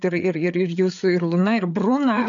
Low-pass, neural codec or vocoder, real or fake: 7.2 kHz; codec, 16 kHz, 4 kbps, FreqCodec, larger model; fake